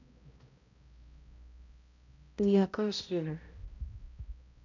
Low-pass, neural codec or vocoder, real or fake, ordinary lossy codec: 7.2 kHz; codec, 16 kHz, 0.5 kbps, X-Codec, HuBERT features, trained on balanced general audio; fake; none